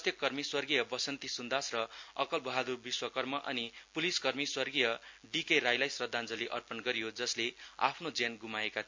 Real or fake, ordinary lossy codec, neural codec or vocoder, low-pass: real; MP3, 48 kbps; none; 7.2 kHz